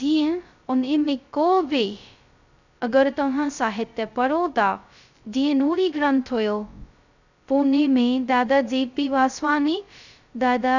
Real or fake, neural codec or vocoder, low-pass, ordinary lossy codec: fake; codec, 16 kHz, 0.2 kbps, FocalCodec; 7.2 kHz; none